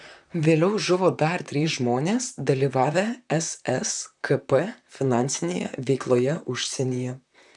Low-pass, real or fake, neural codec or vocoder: 10.8 kHz; fake; vocoder, 44.1 kHz, 128 mel bands, Pupu-Vocoder